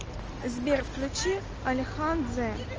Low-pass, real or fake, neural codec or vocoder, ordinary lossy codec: 7.2 kHz; real; none; Opus, 24 kbps